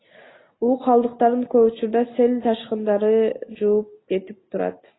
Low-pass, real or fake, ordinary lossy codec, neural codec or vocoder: 7.2 kHz; real; AAC, 16 kbps; none